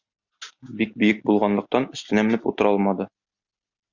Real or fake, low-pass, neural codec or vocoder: real; 7.2 kHz; none